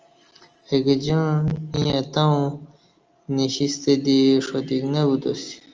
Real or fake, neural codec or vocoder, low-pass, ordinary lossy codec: real; none; 7.2 kHz; Opus, 24 kbps